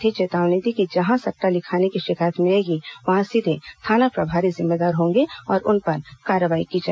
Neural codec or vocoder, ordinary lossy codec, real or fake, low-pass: none; none; real; none